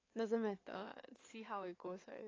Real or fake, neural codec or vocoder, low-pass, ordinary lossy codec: fake; codec, 16 kHz in and 24 kHz out, 2.2 kbps, FireRedTTS-2 codec; 7.2 kHz; AAC, 32 kbps